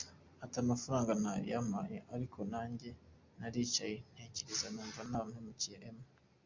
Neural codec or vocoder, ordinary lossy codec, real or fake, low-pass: none; MP3, 64 kbps; real; 7.2 kHz